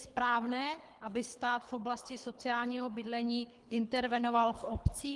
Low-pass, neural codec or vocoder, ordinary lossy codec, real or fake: 10.8 kHz; codec, 24 kHz, 3 kbps, HILCodec; Opus, 24 kbps; fake